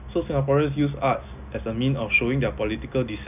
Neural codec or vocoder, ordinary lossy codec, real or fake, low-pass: none; none; real; 3.6 kHz